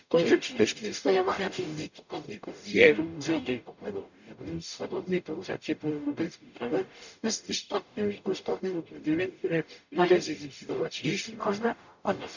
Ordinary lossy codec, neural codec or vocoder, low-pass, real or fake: none; codec, 44.1 kHz, 0.9 kbps, DAC; 7.2 kHz; fake